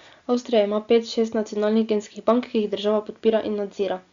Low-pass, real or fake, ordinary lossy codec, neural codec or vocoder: 7.2 kHz; real; Opus, 64 kbps; none